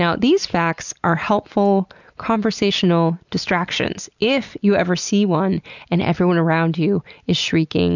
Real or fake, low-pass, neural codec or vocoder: real; 7.2 kHz; none